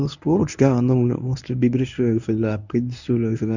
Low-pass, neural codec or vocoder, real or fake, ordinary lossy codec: 7.2 kHz; codec, 24 kHz, 0.9 kbps, WavTokenizer, medium speech release version 1; fake; none